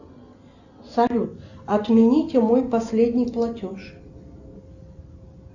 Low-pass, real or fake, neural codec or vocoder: 7.2 kHz; real; none